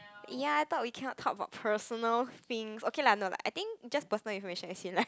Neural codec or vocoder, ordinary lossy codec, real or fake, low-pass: none; none; real; none